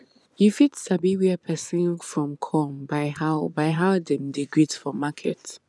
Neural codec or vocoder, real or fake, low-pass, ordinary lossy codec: vocoder, 24 kHz, 100 mel bands, Vocos; fake; none; none